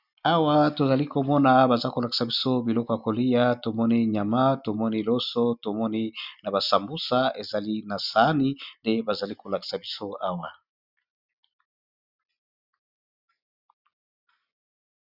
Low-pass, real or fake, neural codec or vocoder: 5.4 kHz; real; none